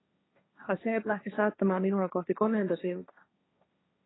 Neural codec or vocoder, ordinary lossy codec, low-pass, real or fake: codec, 16 kHz, 1.1 kbps, Voila-Tokenizer; AAC, 16 kbps; 7.2 kHz; fake